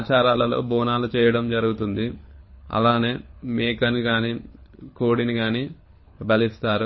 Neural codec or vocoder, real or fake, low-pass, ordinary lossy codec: codec, 24 kHz, 6 kbps, HILCodec; fake; 7.2 kHz; MP3, 24 kbps